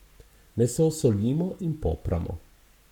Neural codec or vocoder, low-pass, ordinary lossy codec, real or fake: codec, 44.1 kHz, 7.8 kbps, Pupu-Codec; 19.8 kHz; none; fake